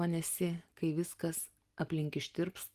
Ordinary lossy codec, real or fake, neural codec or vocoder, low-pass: Opus, 24 kbps; real; none; 14.4 kHz